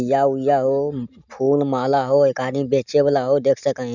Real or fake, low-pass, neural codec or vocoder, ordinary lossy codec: real; 7.2 kHz; none; none